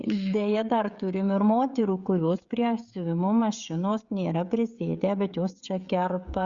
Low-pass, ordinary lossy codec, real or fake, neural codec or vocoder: 7.2 kHz; Opus, 64 kbps; fake; codec, 16 kHz, 16 kbps, FreqCodec, smaller model